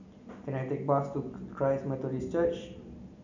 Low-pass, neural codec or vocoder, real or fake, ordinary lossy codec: 7.2 kHz; none; real; none